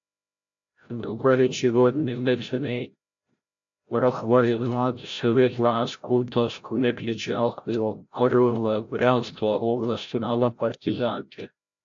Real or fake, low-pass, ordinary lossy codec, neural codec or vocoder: fake; 7.2 kHz; AAC, 64 kbps; codec, 16 kHz, 0.5 kbps, FreqCodec, larger model